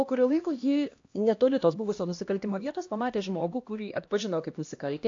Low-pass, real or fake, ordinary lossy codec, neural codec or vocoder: 7.2 kHz; fake; AAC, 48 kbps; codec, 16 kHz, 1 kbps, X-Codec, HuBERT features, trained on LibriSpeech